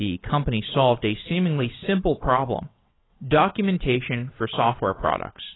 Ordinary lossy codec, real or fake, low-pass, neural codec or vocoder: AAC, 16 kbps; fake; 7.2 kHz; vocoder, 22.05 kHz, 80 mel bands, WaveNeXt